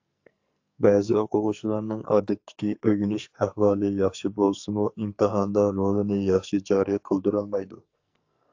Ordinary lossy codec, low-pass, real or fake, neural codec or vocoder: AAC, 48 kbps; 7.2 kHz; fake; codec, 44.1 kHz, 2.6 kbps, SNAC